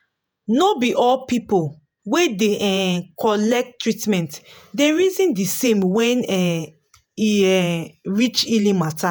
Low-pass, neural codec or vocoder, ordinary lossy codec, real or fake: none; vocoder, 48 kHz, 128 mel bands, Vocos; none; fake